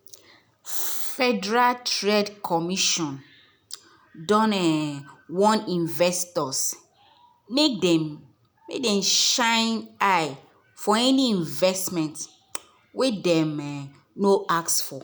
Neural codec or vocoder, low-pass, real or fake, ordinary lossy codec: none; none; real; none